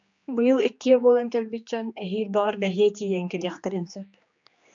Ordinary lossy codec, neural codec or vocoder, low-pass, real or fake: MP3, 64 kbps; codec, 16 kHz, 2 kbps, X-Codec, HuBERT features, trained on general audio; 7.2 kHz; fake